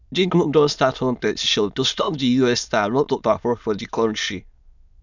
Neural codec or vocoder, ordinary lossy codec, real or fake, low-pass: autoencoder, 22.05 kHz, a latent of 192 numbers a frame, VITS, trained on many speakers; none; fake; 7.2 kHz